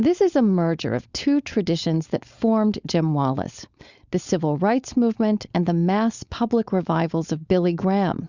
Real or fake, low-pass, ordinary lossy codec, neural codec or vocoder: fake; 7.2 kHz; Opus, 64 kbps; codec, 16 kHz, 4.8 kbps, FACodec